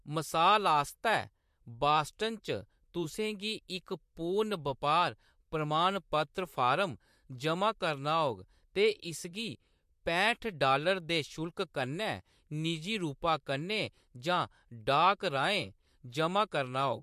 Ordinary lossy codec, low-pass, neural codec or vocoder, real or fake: MP3, 64 kbps; 14.4 kHz; vocoder, 44.1 kHz, 128 mel bands, Pupu-Vocoder; fake